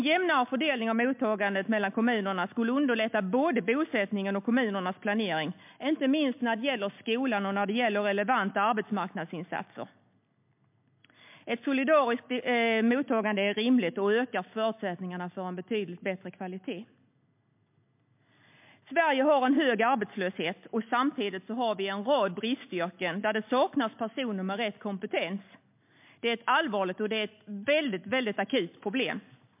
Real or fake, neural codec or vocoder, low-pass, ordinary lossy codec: real; none; 3.6 kHz; MP3, 32 kbps